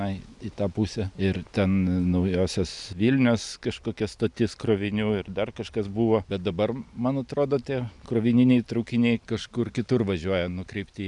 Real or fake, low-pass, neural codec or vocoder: real; 10.8 kHz; none